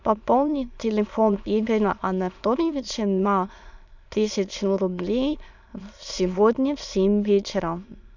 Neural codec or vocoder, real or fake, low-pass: autoencoder, 22.05 kHz, a latent of 192 numbers a frame, VITS, trained on many speakers; fake; 7.2 kHz